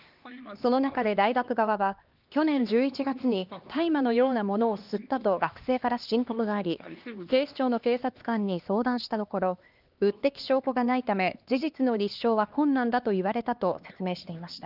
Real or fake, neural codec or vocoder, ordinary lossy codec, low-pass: fake; codec, 16 kHz, 2 kbps, X-Codec, HuBERT features, trained on LibriSpeech; Opus, 32 kbps; 5.4 kHz